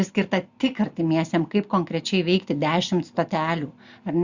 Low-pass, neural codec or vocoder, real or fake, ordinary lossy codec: 7.2 kHz; none; real; Opus, 64 kbps